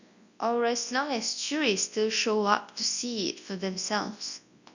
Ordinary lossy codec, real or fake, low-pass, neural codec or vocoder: none; fake; 7.2 kHz; codec, 24 kHz, 0.9 kbps, WavTokenizer, large speech release